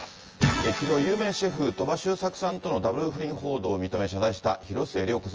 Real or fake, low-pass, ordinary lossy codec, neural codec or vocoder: fake; 7.2 kHz; Opus, 24 kbps; vocoder, 24 kHz, 100 mel bands, Vocos